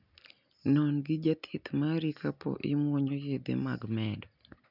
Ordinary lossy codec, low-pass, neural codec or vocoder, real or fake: none; 5.4 kHz; none; real